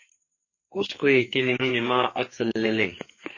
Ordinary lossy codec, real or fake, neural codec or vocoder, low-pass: MP3, 32 kbps; fake; codec, 44.1 kHz, 2.6 kbps, SNAC; 7.2 kHz